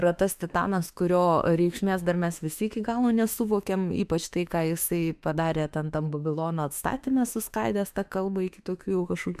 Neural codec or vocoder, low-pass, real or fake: autoencoder, 48 kHz, 32 numbers a frame, DAC-VAE, trained on Japanese speech; 14.4 kHz; fake